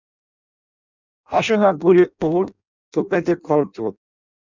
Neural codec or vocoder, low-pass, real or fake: codec, 16 kHz in and 24 kHz out, 0.6 kbps, FireRedTTS-2 codec; 7.2 kHz; fake